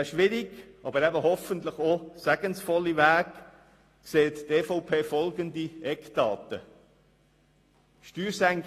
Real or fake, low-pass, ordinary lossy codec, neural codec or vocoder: real; 14.4 kHz; AAC, 48 kbps; none